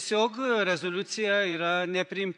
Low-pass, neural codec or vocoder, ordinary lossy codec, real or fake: 10.8 kHz; vocoder, 44.1 kHz, 128 mel bands, Pupu-Vocoder; MP3, 64 kbps; fake